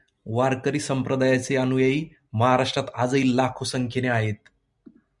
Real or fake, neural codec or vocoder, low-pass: real; none; 10.8 kHz